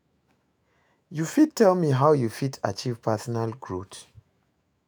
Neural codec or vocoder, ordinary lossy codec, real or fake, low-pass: autoencoder, 48 kHz, 128 numbers a frame, DAC-VAE, trained on Japanese speech; none; fake; none